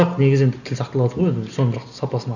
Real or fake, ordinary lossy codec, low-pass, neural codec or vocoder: real; none; 7.2 kHz; none